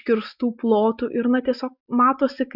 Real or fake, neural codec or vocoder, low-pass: real; none; 5.4 kHz